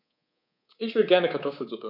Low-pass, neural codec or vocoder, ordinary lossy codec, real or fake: 5.4 kHz; codec, 24 kHz, 3.1 kbps, DualCodec; none; fake